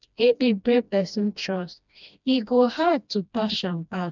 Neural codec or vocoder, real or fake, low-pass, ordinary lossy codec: codec, 16 kHz, 1 kbps, FreqCodec, smaller model; fake; 7.2 kHz; none